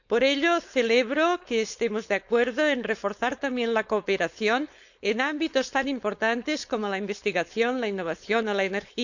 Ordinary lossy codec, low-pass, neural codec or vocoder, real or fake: none; 7.2 kHz; codec, 16 kHz, 4.8 kbps, FACodec; fake